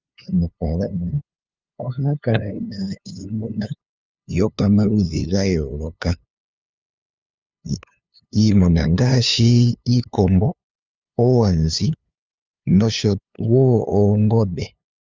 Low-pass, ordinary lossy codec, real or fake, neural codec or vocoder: 7.2 kHz; Opus, 32 kbps; fake; codec, 16 kHz, 2 kbps, FunCodec, trained on LibriTTS, 25 frames a second